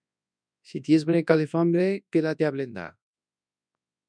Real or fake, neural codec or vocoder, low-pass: fake; codec, 24 kHz, 0.9 kbps, WavTokenizer, large speech release; 9.9 kHz